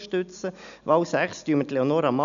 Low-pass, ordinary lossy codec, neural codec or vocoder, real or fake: 7.2 kHz; none; none; real